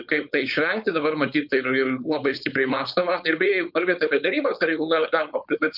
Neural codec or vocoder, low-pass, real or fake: codec, 16 kHz, 4.8 kbps, FACodec; 5.4 kHz; fake